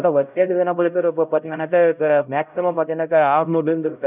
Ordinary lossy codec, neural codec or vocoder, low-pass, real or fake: none; codec, 16 kHz, 0.5 kbps, X-Codec, HuBERT features, trained on LibriSpeech; 3.6 kHz; fake